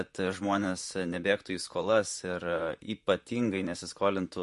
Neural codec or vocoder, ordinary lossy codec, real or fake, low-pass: vocoder, 44.1 kHz, 128 mel bands, Pupu-Vocoder; MP3, 48 kbps; fake; 14.4 kHz